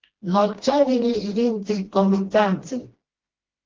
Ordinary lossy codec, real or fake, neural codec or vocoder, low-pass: Opus, 16 kbps; fake; codec, 16 kHz, 1 kbps, FreqCodec, smaller model; 7.2 kHz